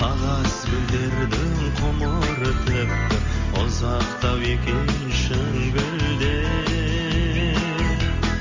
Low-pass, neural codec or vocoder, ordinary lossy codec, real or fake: 7.2 kHz; none; Opus, 32 kbps; real